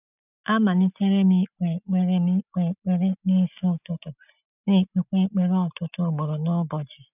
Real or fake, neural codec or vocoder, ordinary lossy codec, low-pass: real; none; none; 3.6 kHz